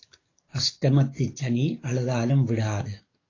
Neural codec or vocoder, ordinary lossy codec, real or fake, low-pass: codec, 44.1 kHz, 7.8 kbps, DAC; AAC, 32 kbps; fake; 7.2 kHz